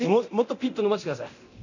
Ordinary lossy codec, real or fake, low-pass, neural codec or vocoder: none; fake; 7.2 kHz; codec, 24 kHz, 0.9 kbps, DualCodec